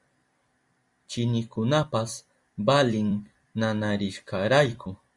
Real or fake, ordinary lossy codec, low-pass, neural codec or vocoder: fake; Opus, 64 kbps; 10.8 kHz; vocoder, 44.1 kHz, 128 mel bands every 256 samples, BigVGAN v2